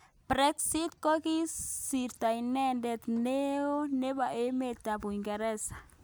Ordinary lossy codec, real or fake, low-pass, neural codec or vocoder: none; real; none; none